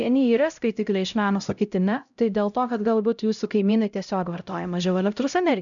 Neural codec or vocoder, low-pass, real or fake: codec, 16 kHz, 0.5 kbps, X-Codec, HuBERT features, trained on LibriSpeech; 7.2 kHz; fake